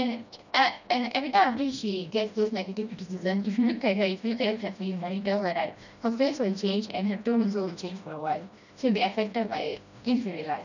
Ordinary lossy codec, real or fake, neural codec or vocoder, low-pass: none; fake; codec, 16 kHz, 1 kbps, FreqCodec, smaller model; 7.2 kHz